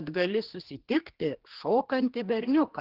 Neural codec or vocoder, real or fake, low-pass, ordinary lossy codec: codec, 16 kHz, 2 kbps, X-Codec, HuBERT features, trained on general audio; fake; 5.4 kHz; Opus, 16 kbps